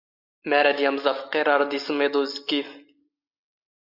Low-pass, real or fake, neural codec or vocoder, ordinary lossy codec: 5.4 kHz; real; none; AAC, 48 kbps